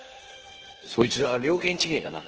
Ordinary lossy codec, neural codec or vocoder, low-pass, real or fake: Opus, 16 kbps; codec, 16 kHz in and 24 kHz out, 0.9 kbps, LongCat-Audio-Codec, four codebook decoder; 7.2 kHz; fake